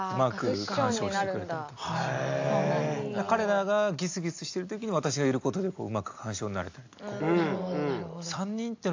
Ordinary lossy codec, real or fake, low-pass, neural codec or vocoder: AAC, 48 kbps; real; 7.2 kHz; none